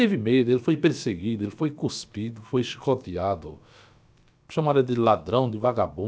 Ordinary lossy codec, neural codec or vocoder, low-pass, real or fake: none; codec, 16 kHz, about 1 kbps, DyCAST, with the encoder's durations; none; fake